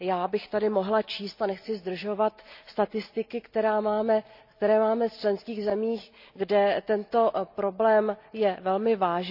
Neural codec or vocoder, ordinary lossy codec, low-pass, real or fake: none; none; 5.4 kHz; real